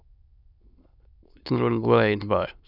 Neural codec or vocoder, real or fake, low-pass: autoencoder, 22.05 kHz, a latent of 192 numbers a frame, VITS, trained on many speakers; fake; 5.4 kHz